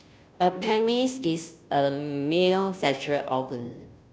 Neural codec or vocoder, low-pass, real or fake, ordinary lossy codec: codec, 16 kHz, 0.5 kbps, FunCodec, trained on Chinese and English, 25 frames a second; none; fake; none